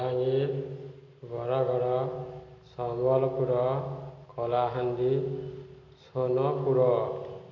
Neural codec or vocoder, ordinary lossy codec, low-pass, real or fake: none; MP3, 48 kbps; 7.2 kHz; real